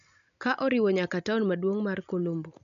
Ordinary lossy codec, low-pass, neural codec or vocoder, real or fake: none; 7.2 kHz; none; real